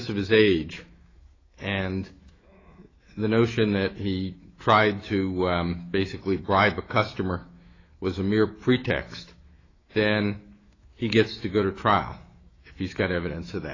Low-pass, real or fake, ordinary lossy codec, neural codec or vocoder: 7.2 kHz; fake; AAC, 32 kbps; autoencoder, 48 kHz, 128 numbers a frame, DAC-VAE, trained on Japanese speech